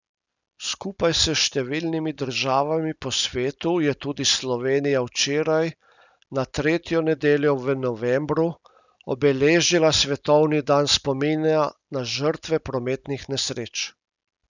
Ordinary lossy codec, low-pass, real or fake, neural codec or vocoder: none; 7.2 kHz; real; none